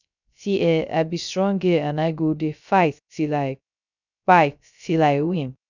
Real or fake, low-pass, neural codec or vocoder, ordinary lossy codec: fake; 7.2 kHz; codec, 16 kHz, 0.3 kbps, FocalCodec; none